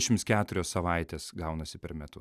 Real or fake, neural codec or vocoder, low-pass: real; none; 14.4 kHz